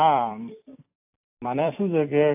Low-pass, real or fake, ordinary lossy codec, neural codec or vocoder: 3.6 kHz; fake; AAC, 32 kbps; vocoder, 44.1 kHz, 128 mel bands every 256 samples, BigVGAN v2